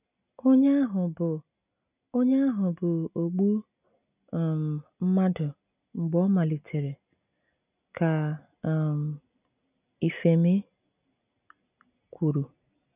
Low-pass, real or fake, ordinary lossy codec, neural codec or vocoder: 3.6 kHz; real; none; none